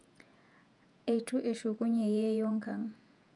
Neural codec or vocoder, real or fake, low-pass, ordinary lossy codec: vocoder, 48 kHz, 128 mel bands, Vocos; fake; 10.8 kHz; none